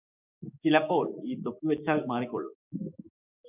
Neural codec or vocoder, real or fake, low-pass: codec, 16 kHz, 6 kbps, DAC; fake; 3.6 kHz